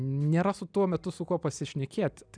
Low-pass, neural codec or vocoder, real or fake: 9.9 kHz; none; real